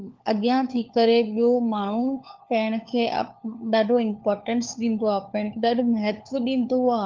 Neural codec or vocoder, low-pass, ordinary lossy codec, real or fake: codec, 16 kHz, 4 kbps, FunCodec, trained on LibriTTS, 50 frames a second; 7.2 kHz; Opus, 32 kbps; fake